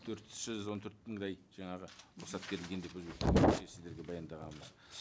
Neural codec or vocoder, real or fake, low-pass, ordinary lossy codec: none; real; none; none